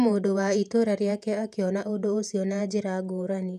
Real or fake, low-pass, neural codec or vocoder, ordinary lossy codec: fake; 14.4 kHz; vocoder, 48 kHz, 128 mel bands, Vocos; none